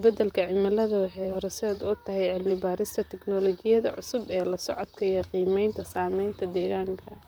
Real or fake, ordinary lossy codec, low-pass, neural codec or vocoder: fake; none; none; vocoder, 44.1 kHz, 128 mel bands, Pupu-Vocoder